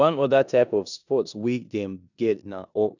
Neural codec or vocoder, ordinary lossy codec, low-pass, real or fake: codec, 16 kHz in and 24 kHz out, 0.9 kbps, LongCat-Audio-Codec, four codebook decoder; none; 7.2 kHz; fake